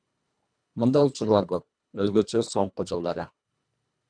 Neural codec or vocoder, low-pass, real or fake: codec, 24 kHz, 1.5 kbps, HILCodec; 9.9 kHz; fake